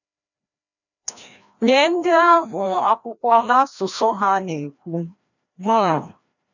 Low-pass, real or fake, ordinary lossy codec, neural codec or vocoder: 7.2 kHz; fake; none; codec, 16 kHz, 1 kbps, FreqCodec, larger model